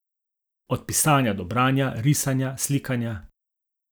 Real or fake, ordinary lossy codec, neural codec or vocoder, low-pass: real; none; none; none